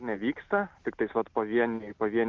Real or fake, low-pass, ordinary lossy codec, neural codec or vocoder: real; 7.2 kHz; Opus, 24 kbps; none